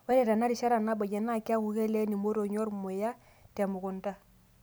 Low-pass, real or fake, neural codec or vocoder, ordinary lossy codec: none; real; none; none